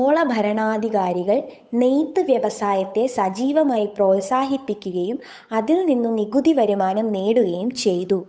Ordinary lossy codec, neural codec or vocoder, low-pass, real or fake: none; codec, 16 kHz, 8 kbps, FunCodec, trained on Chinese and English, 25 frames a second; none; fake